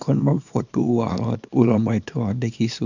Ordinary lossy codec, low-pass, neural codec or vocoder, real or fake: none; 7.2 kHz; codec, 24 kHz, 0.9 kbps, WavTokenizer, small release; fake